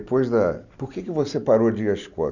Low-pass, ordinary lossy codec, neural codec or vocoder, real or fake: 7.2 kHz; none; none; real